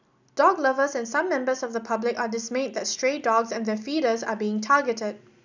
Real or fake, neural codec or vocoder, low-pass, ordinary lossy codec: real; none; 7.2 kHz; none